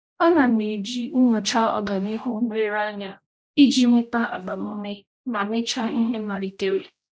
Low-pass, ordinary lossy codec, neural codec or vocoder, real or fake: none; none; codec, 16 kHz, 0.5 kbps, X-Codec, HuBERT features, trained on general audio; fake